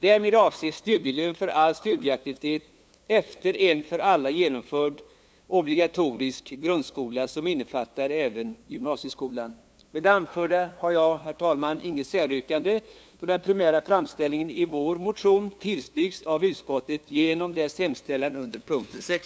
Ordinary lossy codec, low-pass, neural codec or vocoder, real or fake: none; none; codec, 16 kHz, 2 kbps, FunCodec, trained on LibriTTS, 25 frames a second; fake